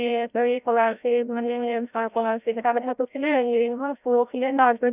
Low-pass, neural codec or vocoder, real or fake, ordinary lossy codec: 3.6 kHz; codec, 16 kHz, 0.5 kbps, FreqCodec, larger model; fake; none